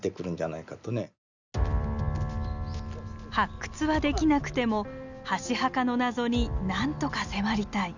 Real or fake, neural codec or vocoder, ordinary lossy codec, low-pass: real; none; none; 7.2 kHz